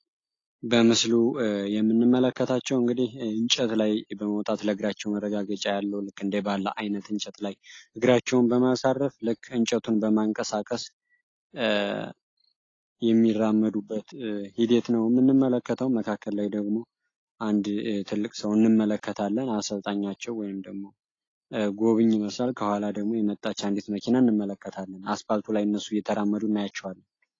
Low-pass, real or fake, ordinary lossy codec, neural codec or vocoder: 7.2 kHz; real; AAC, 32 kbps; none